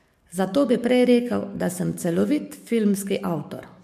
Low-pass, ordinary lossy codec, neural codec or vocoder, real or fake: 14.4 kHz; MP3, 64 kbps; codec, 44.1 kHz, 7.8 kbps, DAC; fake